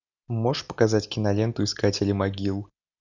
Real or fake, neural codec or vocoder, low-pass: real; none; 7.2 kHz